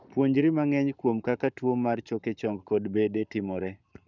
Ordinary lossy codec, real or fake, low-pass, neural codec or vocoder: none; fake; 7.2 kHz; codec, 44.1 kHz, 7.8 kbps, Pupu-Codec